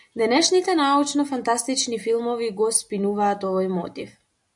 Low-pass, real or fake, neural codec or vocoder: 10.8 kHz; real; none